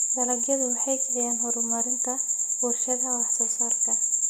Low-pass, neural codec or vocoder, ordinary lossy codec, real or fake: none; none; none; real